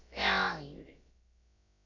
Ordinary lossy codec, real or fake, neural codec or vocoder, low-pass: MP3, 64 kbps; fake; codec, 16 kHz, about 1 kbps, DyCAST, with the encoder's durations; 7.2 kHz